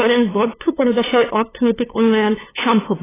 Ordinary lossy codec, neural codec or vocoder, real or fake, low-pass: AAC, 16 kbps; codec, 16 kHz, 2 kbps, FunCodec, trained on LibriTTS, 25 frames a second; fake; 3.6 kHz